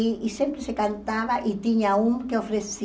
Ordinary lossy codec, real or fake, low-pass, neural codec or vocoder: none; real; none; none